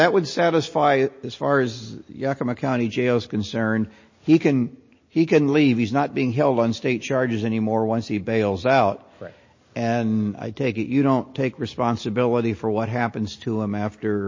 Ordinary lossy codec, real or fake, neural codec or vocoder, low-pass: MP3, 32 kbps; real; none; 7.2 kHz